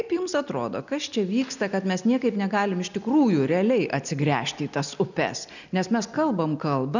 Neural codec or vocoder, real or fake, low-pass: none; real; 7.2 kHz